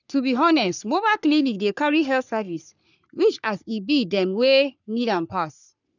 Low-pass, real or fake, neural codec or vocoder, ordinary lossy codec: 7.2 kHz; fake; codec, 44.1 kHz, 3.4 kbps, Pupu-Codec; none